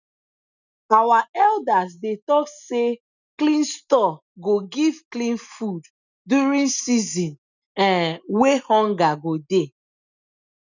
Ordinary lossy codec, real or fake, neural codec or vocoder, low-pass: none; real; none; 7.2 kHz